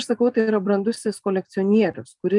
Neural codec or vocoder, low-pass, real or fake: none; 10.8 kHz; real